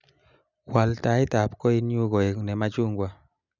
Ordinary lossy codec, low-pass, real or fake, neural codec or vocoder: none; 7.2 kHz; real; none